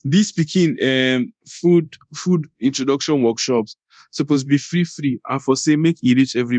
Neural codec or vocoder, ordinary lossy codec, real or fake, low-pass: codec, 24 kHz, 0.9 kbps, DualCodec; none; fake; 9.9 kHz